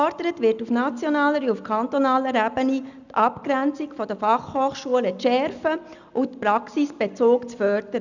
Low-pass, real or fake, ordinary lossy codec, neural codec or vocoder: 7.2 kHz; real; none; none